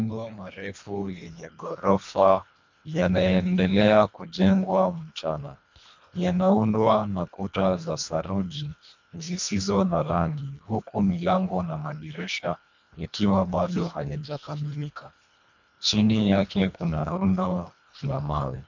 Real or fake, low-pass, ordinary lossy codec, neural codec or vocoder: fake; 7.2 kHz; MP3, 64 kbps; codec, 24 kHz, 1.5 kbps, HILCodec